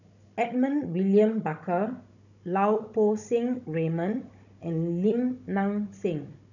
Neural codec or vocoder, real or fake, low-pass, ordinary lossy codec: codec, 16 kHz, 16 kbps, FunCodec, trained on Chinese and English, 50 frames a second; fake; 7.2 kHz; none